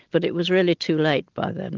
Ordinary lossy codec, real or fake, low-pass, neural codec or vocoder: Opus, 32 kbps; real; 7.2 kHz; none